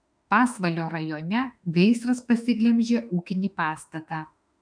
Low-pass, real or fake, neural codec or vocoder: 9.9 kHz; fake; autoencoder, 48 kHz, 32 numbers a frame, DAC-VAE, trained on Japanese speech